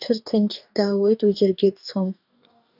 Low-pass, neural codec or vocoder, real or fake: 5.4 kHz; codec, 44.1 kHz, 2.6 kbps, DAC; fake